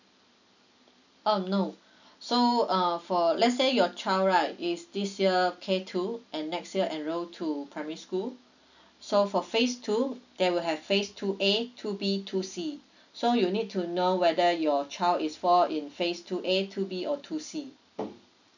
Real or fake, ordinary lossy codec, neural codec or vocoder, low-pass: real; none; none; 7.2 kHz